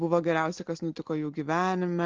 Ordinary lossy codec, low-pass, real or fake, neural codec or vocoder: Opus, 16 kbps; 7.2 kHz; real; none